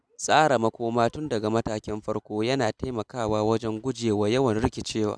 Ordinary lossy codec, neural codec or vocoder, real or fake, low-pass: none; none; real; none